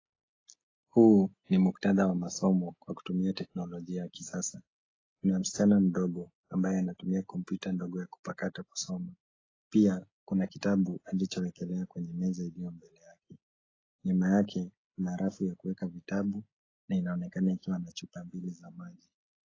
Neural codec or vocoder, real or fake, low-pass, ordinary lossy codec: none; real; 7.2 kHz; AAC, 32 kbps